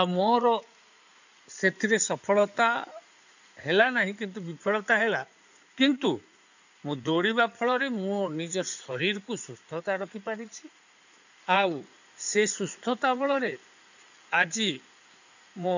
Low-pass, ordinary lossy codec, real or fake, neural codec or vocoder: 7.2 kHz; none; fake; codec, 16 kHz in and 24 kHz out, 2.2 kbps, FireRedTTS-2 codec